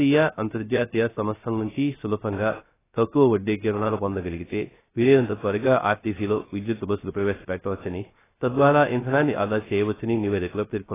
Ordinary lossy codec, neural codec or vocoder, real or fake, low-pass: AAC, 16 kbps; codec, 16 kHz, 0.3 kbps, FocalCodec; fake; 3.6 kHz